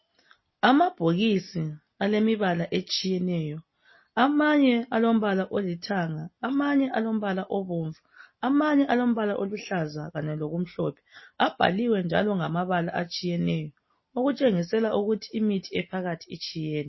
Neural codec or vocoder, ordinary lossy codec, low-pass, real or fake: none; MP3, 24 kbps; 7.2 kHz; real